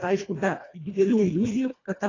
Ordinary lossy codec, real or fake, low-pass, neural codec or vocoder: AAC, 32 kbps; fake; 7.2 kHz; codec, 24 kHz, 1.5 kbps, HILCodec